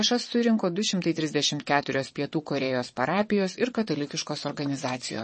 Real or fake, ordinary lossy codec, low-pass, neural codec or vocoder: fake; MP3, 32 kbps; 9.9 kHz; vocoder, 22.05 kHz, 80 mel bands, WaveNeXt